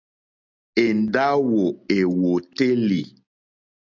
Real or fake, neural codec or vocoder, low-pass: real; none; 7.2 kHz